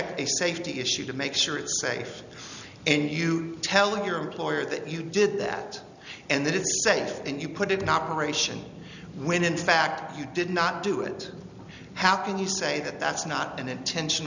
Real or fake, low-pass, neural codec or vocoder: real; 7.2 kHz; none